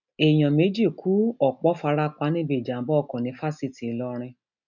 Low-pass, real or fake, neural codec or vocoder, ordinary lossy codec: 7.2 kHz; real; none; none